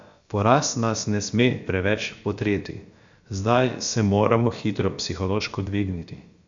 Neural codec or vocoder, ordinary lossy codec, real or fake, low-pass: codec, 16 kHz, about 1 kbps, DyCAST, with the encoder's durations; Opus, 64 kbps; fake; 7.2 kHz